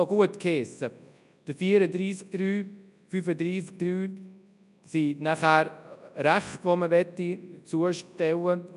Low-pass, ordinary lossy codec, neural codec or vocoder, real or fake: 10.8 kHz; none; codec, 24 kHz, 0.9 kbps, WavTokenizer, large speech release; fake